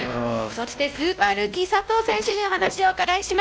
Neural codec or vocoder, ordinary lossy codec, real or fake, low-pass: codec, 16 kHz, 1 kbps, X-Codec, WavLM features, trained on Multilingual LibriSpeech; none; fake; none